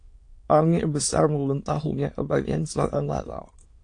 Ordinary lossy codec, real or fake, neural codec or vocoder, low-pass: AAC, 48 kbps; fake; autoencoder, 22.05 kHz, a latent of 192 numbers a frame, VITS, trained on many speakers; 9.9 kHz